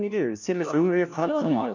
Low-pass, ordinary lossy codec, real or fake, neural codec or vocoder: 7.2 kHz; none; fake; codec, 16 kHz, 1 kbps, FunCodec, trained on LibriTTS, 50 frames a second